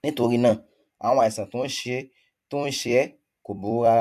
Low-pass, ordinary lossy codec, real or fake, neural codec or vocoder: 14.4 kHz; MP3, 96 kbps; fake; vocoder, 44.1 kHz, 128 mel bands every 256 samples, BigVGAN v2